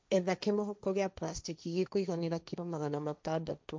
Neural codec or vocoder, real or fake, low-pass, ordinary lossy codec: codec, 16 kHz, 1.1 kbps, Voila-Tokenizer; fake; none; none